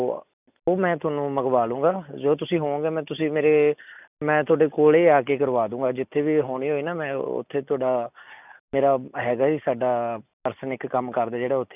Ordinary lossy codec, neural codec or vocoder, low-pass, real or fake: none; none; 3.6 kHz; real